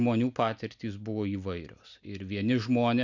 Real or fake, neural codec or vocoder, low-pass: real; none; 7.2 kHz